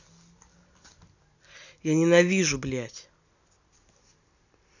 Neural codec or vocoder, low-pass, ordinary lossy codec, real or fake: none; 7.2 kHz; none; real